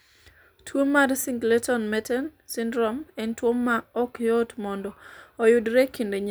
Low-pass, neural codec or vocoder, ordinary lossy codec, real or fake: none; none; none; real